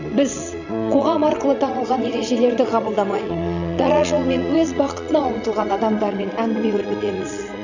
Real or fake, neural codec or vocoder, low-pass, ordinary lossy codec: fake; vocoder, 22.05 kHz, 80 mel bands, Vocos; 7.2 kHz; none